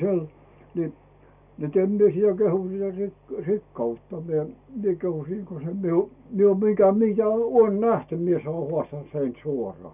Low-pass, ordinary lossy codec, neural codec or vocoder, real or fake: 3.6 kHz; none; none; real